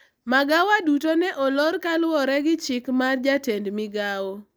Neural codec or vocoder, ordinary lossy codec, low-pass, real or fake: none; none; none; real